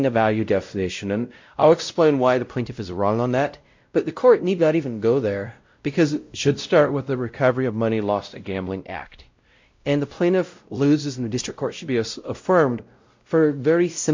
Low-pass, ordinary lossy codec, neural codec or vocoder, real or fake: 7.2 kHz; MP3, 48 kbps; codec, 16 kHz, 0.5 kbps, X-Codec, WavLM features, trained on Multilingual LibriSpeech; fake